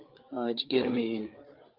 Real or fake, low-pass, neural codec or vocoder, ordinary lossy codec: fake; 5.4 kHz; codec, 16 kHz, 8 kbps, FreqCodec, larger model; Opus, 32 kbps